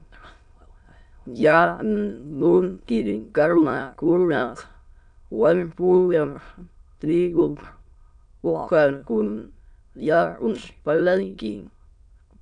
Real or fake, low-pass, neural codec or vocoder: fake; 9.9 kHz; autoencoder, 22.05 kHz, a latent of 192 numbers a frame, VITS, trained on many speakers